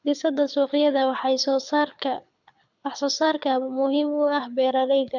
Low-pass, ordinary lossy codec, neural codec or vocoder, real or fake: 7.2 kHz; none; codec, 24 kHz, 6 kbps, HILCodec; fake